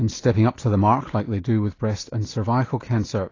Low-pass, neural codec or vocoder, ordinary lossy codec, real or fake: 7.2 kHz; none; AAC, 32 kbps; real